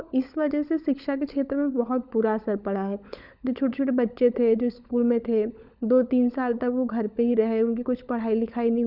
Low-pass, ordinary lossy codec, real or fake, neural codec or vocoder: 5.4 kHz; none; fake; codec, 16 kHz, 16 kbps, FunCodec, trained on LibriTTS, 50 frames a second